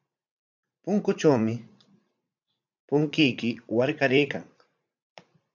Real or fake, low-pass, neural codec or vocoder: fake; 7.2 kHz; vocoder, 44.1 kHz, 80 mel bands, Vocos